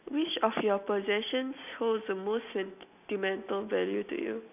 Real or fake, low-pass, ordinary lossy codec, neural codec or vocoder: real; 3.6 kHz; none; none